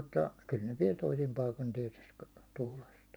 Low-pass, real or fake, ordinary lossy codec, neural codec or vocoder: none; fake; none; vocoder, 44.1 kHz, 128 mel bands every 512 samples, BigVGAN v2